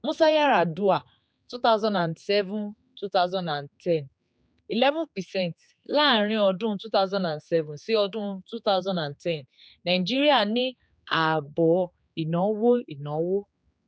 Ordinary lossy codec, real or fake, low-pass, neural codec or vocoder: none; fake; none; codec, 16 kHz, 4 kbps, X-Codec, HuBERT features, trained on general audio